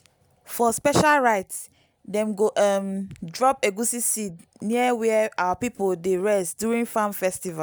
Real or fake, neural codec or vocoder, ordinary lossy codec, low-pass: real; none; none; none